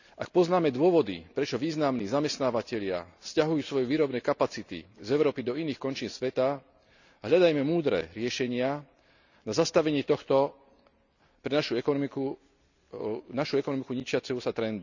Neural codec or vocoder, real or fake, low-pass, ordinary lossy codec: none; real; 7.2 kHz; none